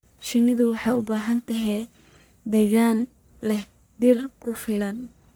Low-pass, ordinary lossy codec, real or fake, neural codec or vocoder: none; none; fake; codec, 44.1 kHz, 1.7 kbps, Pupu-Codec